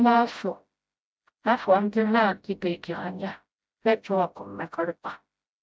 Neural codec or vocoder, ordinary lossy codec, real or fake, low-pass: codec, 16 kHz, 0.5 kbps, FreqCodec, smaller model; none; fake; none